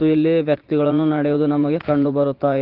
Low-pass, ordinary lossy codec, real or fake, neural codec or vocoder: 5.4 kHz; Opus, 16 kbps; fake; vocoder, 44.1 kHz, 80 mel bands, Vocos